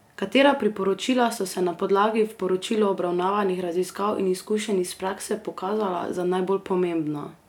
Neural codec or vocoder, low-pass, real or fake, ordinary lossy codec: none; 19.8 kHz; real; none